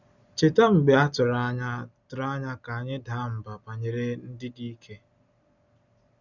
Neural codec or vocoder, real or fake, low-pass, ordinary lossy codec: none; real; 7.2 kHz; none